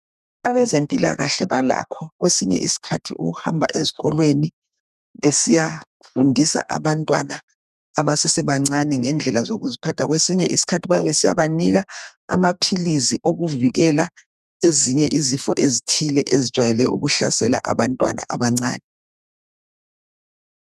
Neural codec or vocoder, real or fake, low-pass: codec, 32 kHz, 1.9 kbps, SNAC; fake; 14.4 kHz